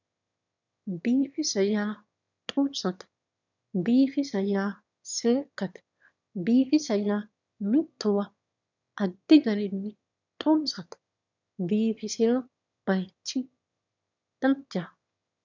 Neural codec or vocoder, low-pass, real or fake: autoencoder, 22.05 kHz, a latent of 192 numbers a frame, VITS, trained on one speaker; 7.2 kHz; fake